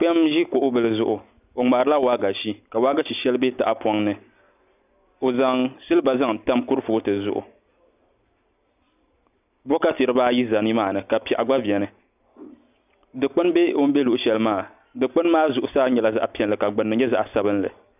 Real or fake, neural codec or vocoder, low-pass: real; none; 3.6 kHz